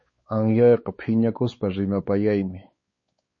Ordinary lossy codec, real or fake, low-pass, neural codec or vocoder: MP3, 32 kbps; fake; 7.2 kHz; codec, 16 kHz, 4 kbps, X-Codec, WavLM features, trained on Multilingual LibriSpeech